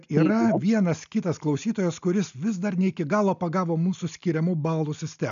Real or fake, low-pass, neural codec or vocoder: real; 7.2 kHz; none